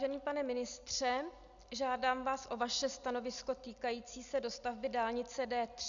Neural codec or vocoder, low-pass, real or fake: none; 7.2 kHz; real